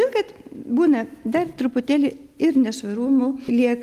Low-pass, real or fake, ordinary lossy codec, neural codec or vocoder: 14.4 kHz; real; Opus, 24 kbps; none